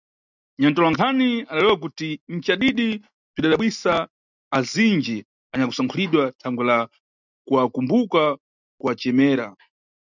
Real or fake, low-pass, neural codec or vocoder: real; 7.2 kHz; none